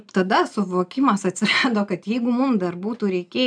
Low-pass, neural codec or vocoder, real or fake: 9.9 kHz; none; real